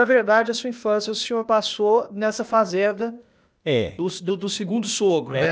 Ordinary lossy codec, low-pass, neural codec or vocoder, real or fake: none; none; codec, 16 kHz, 0.8 kbps, ZipCodec; fake